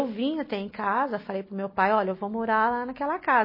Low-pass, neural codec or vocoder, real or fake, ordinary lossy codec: 5.4 kHz; none; real; MP3, 24 kbps